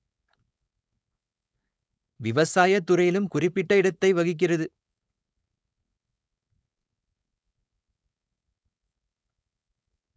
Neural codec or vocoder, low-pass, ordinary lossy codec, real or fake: codec, 16 kHz, 4.8 kbps, FACodec; none; none; fake